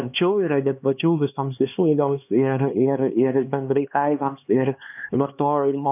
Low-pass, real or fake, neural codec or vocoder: 3.6 kHz; fake; codec, 16 kHz, 2 kbps, X-Codec, HuBERT features, trained on LibriSpeech